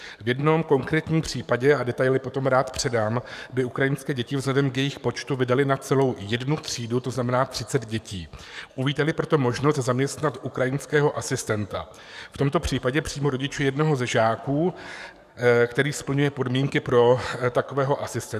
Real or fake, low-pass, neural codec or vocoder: fake; 14.4 kHz; codec, 44.1 kHz, 7.8 kbps, DAC